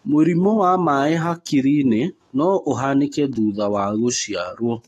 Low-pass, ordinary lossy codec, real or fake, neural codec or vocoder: 19.8 kHz; AAC, 32 kbps; fake; autoencoder, 48 kHz, 128 numbers a frame, DAC-VAE, trained on Japanese speech